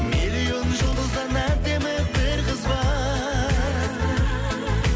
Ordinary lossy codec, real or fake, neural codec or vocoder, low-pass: none; real; none; none